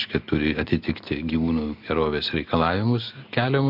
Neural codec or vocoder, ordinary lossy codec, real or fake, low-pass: none; MP3, 48 kbps; real; 5.4 kHz